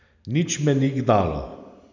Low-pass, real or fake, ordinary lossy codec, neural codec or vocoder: 7.2 kHz; real; none; none